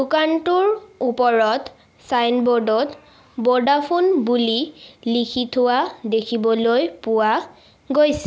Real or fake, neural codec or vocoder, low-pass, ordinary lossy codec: real; none; none; none